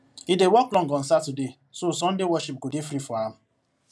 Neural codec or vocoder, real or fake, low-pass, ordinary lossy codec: none; real; none; none